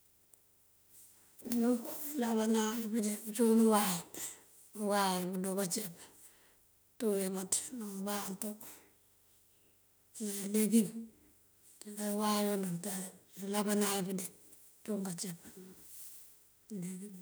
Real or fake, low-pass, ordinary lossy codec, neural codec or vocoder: fake; none; none; autoencoder, 48 kHz, 32 numbers a frame, DAC-VAE, trained on Japanese speech